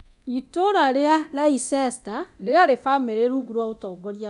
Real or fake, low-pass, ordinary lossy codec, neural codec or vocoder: fake; 10.8 kHz; none; codec, 24 kHz, 0.9 kbps, DualCodec